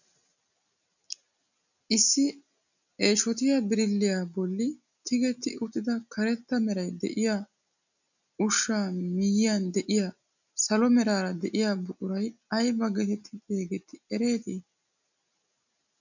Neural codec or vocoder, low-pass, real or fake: none; 7.2 kHz; real